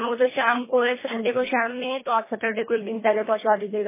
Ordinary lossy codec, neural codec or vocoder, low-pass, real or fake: MP3, 16 kbps; codec, 24 kHz, 1.5 kbps, HILCodec; 3.6 kHz; fake